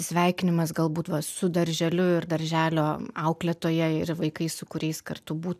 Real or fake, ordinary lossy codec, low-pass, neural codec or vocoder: real; AAC, 96 kbps; 14.4 kHz; none